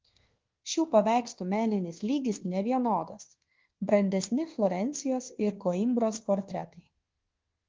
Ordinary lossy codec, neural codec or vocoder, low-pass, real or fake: Opus, 16 kbps; codec, 24 kHz, 1.2 kbps, DualCodec; 7.2 kHz; fake